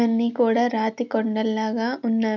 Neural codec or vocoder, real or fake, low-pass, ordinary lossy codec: none; real; 7.2 kHz; none